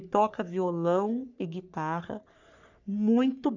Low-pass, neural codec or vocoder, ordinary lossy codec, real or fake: 7.2 kHz; codec, 44.1 kHz, 3.4 kbps, Pupu-Codec; none; fake